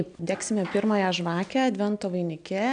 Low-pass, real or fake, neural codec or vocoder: 9.9 kHz; real; none